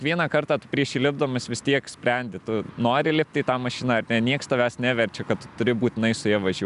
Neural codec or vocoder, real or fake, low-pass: none; real; 10.8 kHz